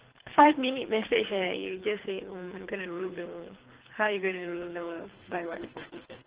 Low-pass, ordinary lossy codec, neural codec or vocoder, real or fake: 3.6 kHz; Opus, 16 kbps; codec, 24 kHz, 3 kbps, HILCodec; fake